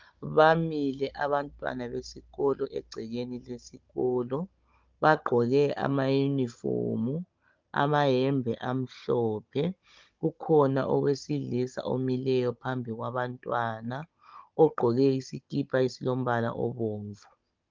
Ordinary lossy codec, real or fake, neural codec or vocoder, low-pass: Opus, 24 kbps; fake; codec, 16 kHz, 16 kbps, FunCodec, trained on Chinese and English, 50 frames a second; 7.2 kHz